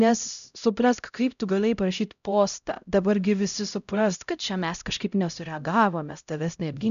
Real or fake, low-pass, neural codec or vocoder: fake; 7.2 kHz; codec, 16 kHz, 0.5 kbps, X-Codec, HuBERT features, trained on LibriSpeech